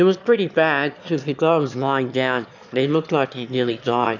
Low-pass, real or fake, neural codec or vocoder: 7.2 kHz; fake; autoencoder, 22.05 kHz, a latent of 192 numbers a frame, VITS, trained on one speaker